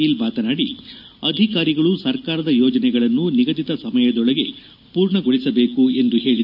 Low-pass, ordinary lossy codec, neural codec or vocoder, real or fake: 5.4 kHz; none; none; real